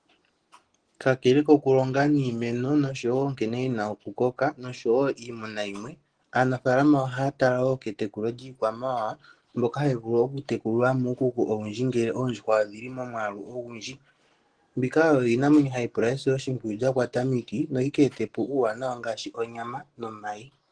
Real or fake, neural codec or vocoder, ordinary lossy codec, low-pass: real; none; Opus, 16 kbps; 9.9 kHz